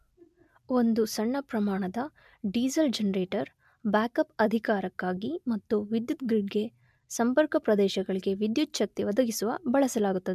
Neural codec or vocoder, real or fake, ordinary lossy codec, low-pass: none; real; MP3, 96 kbps; 14.4 kHz